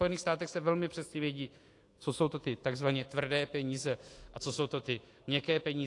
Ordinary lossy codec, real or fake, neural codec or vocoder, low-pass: AAC, 48 kbps; fake; autoencoder, 48 kHz, 128 numbers a frame, DAC-VAE, trained on Japanese speech; 10.8 kHz